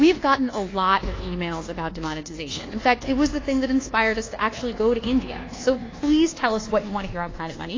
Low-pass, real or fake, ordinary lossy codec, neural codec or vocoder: 7.2 kHz; fake; AAC, 32 kbps; codec, 24 kHz, 1.2 kbps, DualCodec